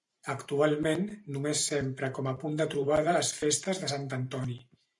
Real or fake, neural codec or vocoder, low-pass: fake; vocoder, 44.1 kHz, 128 mel bands every 512 samples, BigVGAN v2; 10.8 kHz